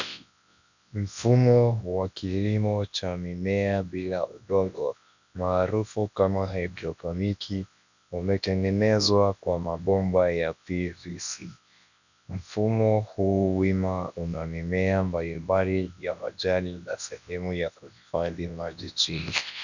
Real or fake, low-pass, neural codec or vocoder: fake; 7.2 kHz; codec, 24 kHz, 0.9 kbps, WavTokenizer, large speech release